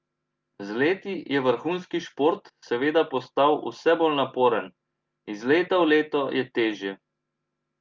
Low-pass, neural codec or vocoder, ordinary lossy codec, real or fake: 7.2 kHz; none; Opus, 32 kbps; real